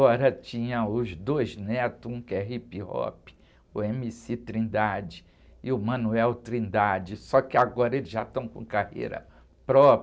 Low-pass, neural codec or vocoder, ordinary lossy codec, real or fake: none; none; none; real